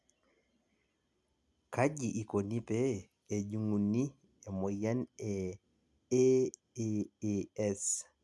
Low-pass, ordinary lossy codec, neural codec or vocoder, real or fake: none; none; none; real